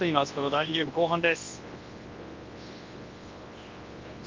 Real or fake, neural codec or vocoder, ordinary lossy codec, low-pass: fake; codec, 24 kHz, 0.9 kbps, WavTokenizer, large speech release; Opus, 32 kbps; 7.2 kHz